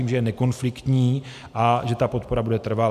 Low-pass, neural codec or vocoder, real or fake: 14.4 kHz; none; real